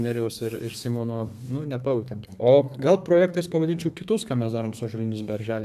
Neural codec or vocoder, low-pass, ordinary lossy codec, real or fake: codec, 32 kHz, 1.9 kbps, SNAC; 14.4 kHz; AAC, 96 kbps; fake